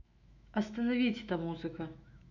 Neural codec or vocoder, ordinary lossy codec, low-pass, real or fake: autoencoder, 48 kHz, 128 numbers a frame, DAC-VAE, trained on Japanese speech; none; 7.2 kHz; fake